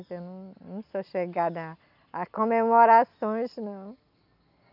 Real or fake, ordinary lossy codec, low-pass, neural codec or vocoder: real; none; 5.4 kHz; none